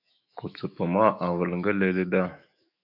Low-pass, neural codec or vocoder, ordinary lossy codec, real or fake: 5.4 kHz; autoencoder, 48 kHz, 128 numbers a frame, DAC-VAE, trained on Japanese speech; AAC, 48 kbps; fake